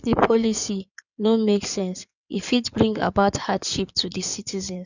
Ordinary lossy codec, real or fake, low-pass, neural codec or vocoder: none; fake; 7.2 kHz; codec, 16 kHz in and 24 kHz out, 2.2 kbps, FireRedTTS-2 codec